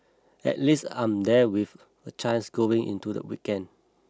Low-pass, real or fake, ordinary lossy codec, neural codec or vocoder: none; real; none; none